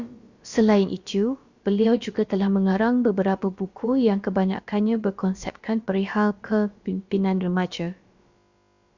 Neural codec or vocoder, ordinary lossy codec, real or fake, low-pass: codec, 16 kHz, about 1 kbps, DyCAST, with the encoder's durations; Opus, 64 kbps; fake; 7.2 kHz